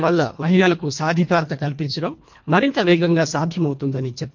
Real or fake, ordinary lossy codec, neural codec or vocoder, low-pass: fake; MP3, 48 kbps; codec, 24 kHz, 1.5 kbps, HILCodec; 7.2 kHz